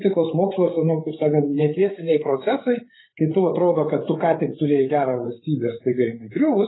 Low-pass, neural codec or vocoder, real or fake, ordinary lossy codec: 7.2 kHz; codec, 16 kHz, 8 kbps, FreqCodec, larger model; fake; AAC, 16 kbps